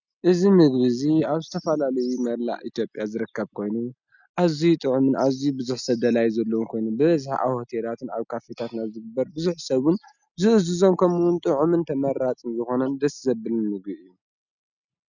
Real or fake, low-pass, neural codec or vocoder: real; 7.2 kHz; none